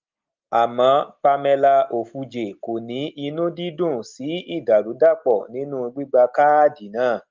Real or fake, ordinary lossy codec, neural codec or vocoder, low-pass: real; Opus, 32 kbps; none; 7.2 kHz